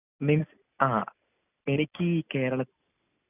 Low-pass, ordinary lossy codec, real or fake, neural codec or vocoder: 3.6 kHz; none; real; none